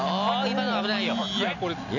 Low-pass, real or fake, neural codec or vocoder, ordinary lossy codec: 7.2 kHz; real; none; none